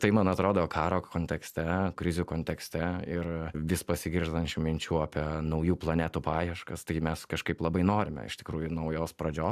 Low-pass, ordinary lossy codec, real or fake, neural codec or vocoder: 14.4 kHz; AAC, 96 kbps; real; none